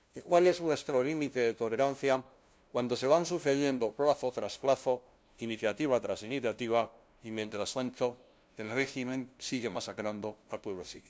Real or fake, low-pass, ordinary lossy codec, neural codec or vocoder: fake; none; none; codec, 16 kHz, 0.5 kbps, FunCodec, trained on LibriTTS, 25 frames a second